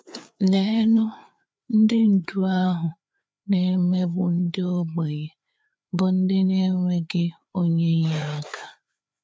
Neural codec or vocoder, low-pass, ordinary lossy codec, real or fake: codec, 16 kHz, 4 kbps, FreqCodec, larger model; none; none; fake